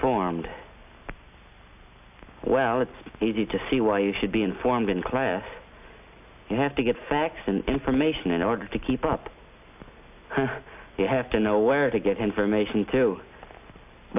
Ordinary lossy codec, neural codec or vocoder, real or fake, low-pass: AAC, 32 kbps; none; real; 3.6 kHz